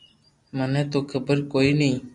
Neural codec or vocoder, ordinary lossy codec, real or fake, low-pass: none; MP3, 96 kbps; real; 10.8 kHz